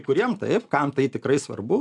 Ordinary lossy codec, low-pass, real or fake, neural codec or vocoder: MP3, 96 kbps; 10.8 kHz; real; none